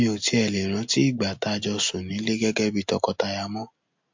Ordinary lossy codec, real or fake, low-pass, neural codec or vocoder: MP3, 48 kbps; real; 7.2 kHz; none